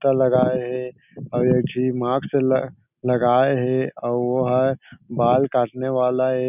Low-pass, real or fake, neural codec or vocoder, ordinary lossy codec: 3.6 kHz; real; none; none